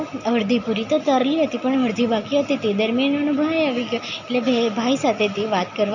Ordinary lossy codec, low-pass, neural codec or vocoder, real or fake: none; 7.2 kHz; none; real